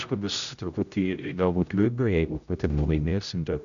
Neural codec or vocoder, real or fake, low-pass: codec, 16 kHz, 0.5 kbps, X-Codec, HuBERT features, trained on general audio; fake; 7.2 kHz